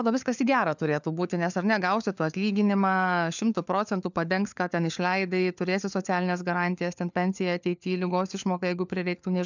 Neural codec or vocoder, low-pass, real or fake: codec, 16 kHz, 4 kbps, FunCodec, trained on LibriTTS, 50 frames a second; 7.2 kHz; fake